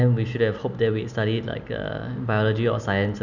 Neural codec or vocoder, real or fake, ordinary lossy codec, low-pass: none; real; none; 7.2 kHz